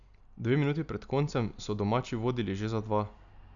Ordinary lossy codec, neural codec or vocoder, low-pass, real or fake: none; none; 7.2 kHz; real